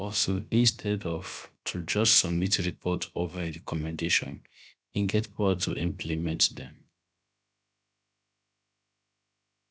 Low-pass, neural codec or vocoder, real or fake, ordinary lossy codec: none; codec, 16 kHz, about 1 kbps, DyCAST, with the encoder's durations; fake; none